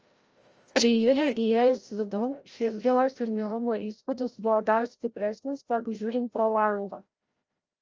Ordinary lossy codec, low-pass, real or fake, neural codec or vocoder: Opus, 24 kbps; 7.2 kHz; fake; codec, 16 kHz, 0.5 kbps, FreqCodec, larger model